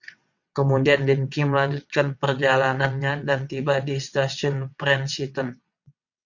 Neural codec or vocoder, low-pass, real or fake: vocoder, 22.05 kHz, 80 mel bands, WaveNeXt; 7.2 kHz; fake